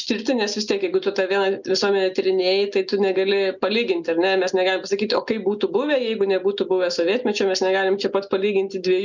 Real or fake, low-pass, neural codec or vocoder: real; 7.2 kHz; none